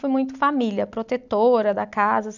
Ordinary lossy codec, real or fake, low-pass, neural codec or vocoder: none; real; 7.2 kHz; none